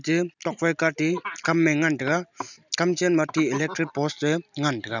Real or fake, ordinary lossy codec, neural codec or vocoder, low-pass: real; none; none; 7.2 kHz